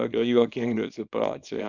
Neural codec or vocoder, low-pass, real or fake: codec, 24 kHz, 0.9 kbps, WavTokenizer, small release; 7.2 kHz; fake